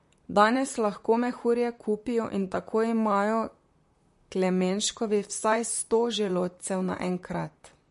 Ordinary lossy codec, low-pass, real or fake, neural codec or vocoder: MP3, 48 kbps; 14.4 kHz; fake; vocoder, 44.1 kHz, 128 mel bands, Pupu-Vocoder